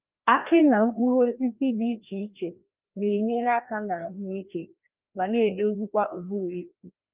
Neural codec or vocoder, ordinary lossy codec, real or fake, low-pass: codec, 16 kHz, 1 kbps, FreqCodec, larger model; Opus, 32 kbps; fake; 3.6 kHz